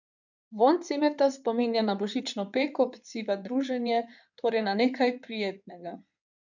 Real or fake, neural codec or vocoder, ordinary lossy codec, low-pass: fake; codec, 16 kHz in and 24 kHz out, 2.2 kbps, FireRedTTS-2 codec; none; 7.2 kHz